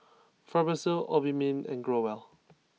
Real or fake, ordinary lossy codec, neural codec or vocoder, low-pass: real; none; none; none